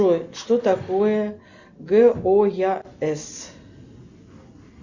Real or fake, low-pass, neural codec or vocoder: real; 7.2 kHz; none